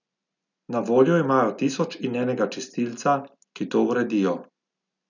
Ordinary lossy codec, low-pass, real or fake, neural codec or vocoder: none; 7.2 kHz; real; none